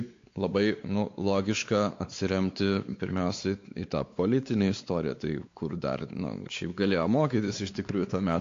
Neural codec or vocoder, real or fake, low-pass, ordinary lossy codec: codec, 16 kHz, 4 kbps, X-Codec, WavLM features, trained on Multilingual LibriSpeech; fake; 7.2 kHz; AAC, 48 kbps